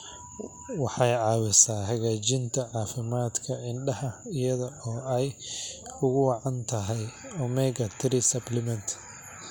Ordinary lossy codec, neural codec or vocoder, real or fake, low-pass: none; none; real; none